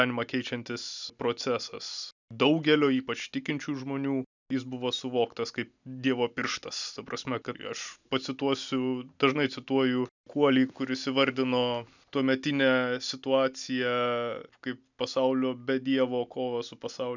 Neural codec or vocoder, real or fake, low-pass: none; real; 7.2 kHz